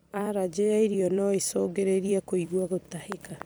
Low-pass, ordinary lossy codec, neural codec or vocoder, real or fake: none; none; vocoder, 44.1 kHz, 128 mel bands every 256 samples, BigVGAN v2; fake